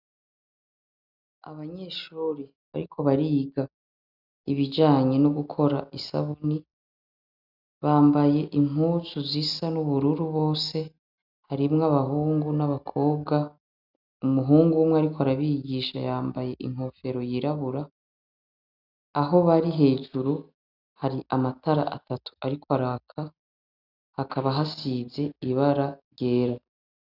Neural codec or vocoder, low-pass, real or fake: none; 5.4 kHz; real